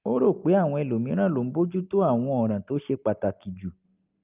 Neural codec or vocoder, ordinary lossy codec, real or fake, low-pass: none; Opus, 24 kbps; real; 3.6 kHz